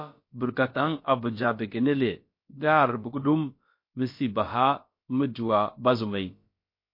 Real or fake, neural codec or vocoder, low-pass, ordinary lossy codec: fake; codec, 16 kHz, about 1 kbps, DyCAST, with the encoder's durations; 5.4 kHz; MP3, 32 kbps